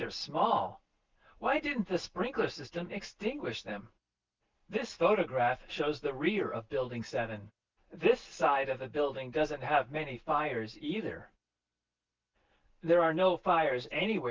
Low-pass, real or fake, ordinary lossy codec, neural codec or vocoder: 7.2 kHz; real; Opus, 24 kbps; none